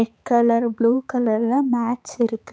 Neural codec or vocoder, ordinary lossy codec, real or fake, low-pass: codec, 16 kHz, 4 kbps, X-Codec, HuBERT features, trained on balanced general audio; none; fake; none